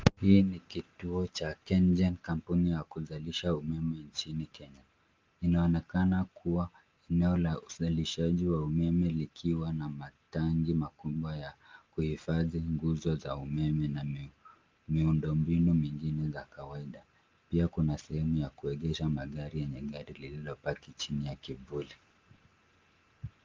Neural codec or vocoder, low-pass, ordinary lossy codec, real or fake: none; 7.2 kHz; Opus, 32 kbps; real